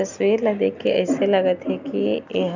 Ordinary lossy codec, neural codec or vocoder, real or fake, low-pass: none; none; real; 7.2 kHz